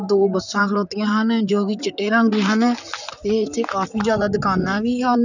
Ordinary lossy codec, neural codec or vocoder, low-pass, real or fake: none; vocoder, 44.1 kHz, 128 mel bands, Pupu-Vocoder; 7.2 kHz; fake